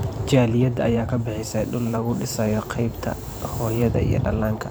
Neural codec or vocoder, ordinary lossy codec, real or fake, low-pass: vocoder, 44.1 kHz, 128 mel bands every 512 samples, BigVGAN v2; none; fake; none